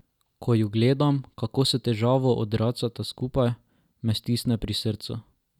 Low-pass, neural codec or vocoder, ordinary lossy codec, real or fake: 19.8 kHz; none; none; real